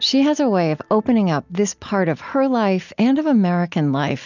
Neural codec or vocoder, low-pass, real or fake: none; 7.2 kHz; real